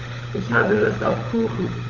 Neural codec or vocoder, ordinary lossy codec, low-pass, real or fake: codec, 16 kHz, 16 kbps, FunCodec, trained on LibriTTS, 50 frames a second; none; 7.2 kHz; fake